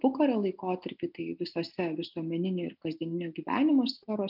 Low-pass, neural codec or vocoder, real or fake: 5.4 kHz; none; real